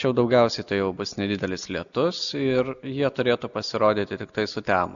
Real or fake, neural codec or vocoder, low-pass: real; none; 7.2 kHz